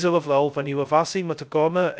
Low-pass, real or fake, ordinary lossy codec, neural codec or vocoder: none; fake; none; codec, 16 kHz, 0.2 kbps, FocalCodec